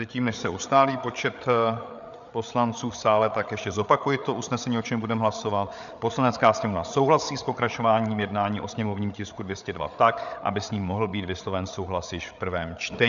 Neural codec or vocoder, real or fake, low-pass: codec, 16 kHz, 8 kbps, FreqCodec, larger model; fake; 7.2 kHz